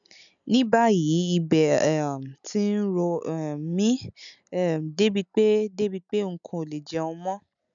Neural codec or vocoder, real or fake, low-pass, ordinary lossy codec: none; real; 7.2 kHz; MP3, 96 kbps